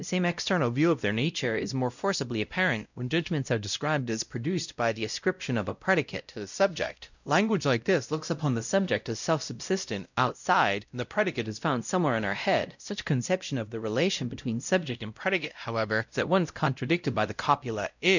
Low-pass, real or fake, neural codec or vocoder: 7.2 kHz; fake; codec, 16 kHz, 0.5 kbps, X-Codec, WavLM features, trained on Multilingual LibriSpeech